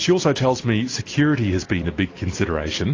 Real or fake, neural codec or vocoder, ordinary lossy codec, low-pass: real; none; AAC, 32 kbps; 7.2 kHz